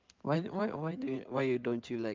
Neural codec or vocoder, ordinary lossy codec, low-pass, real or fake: codec, 16 kHz in and 24 kHz out, 2.2 kbps, FireRedTTS-2 codec; Opus, 24 kbps; 7.2 kHz; fake